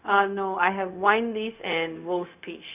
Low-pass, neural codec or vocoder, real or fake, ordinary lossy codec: 3.6 kHz; codec, 16 kHz, 0.4 kbps, LongCat-Audio-Codec; fake; none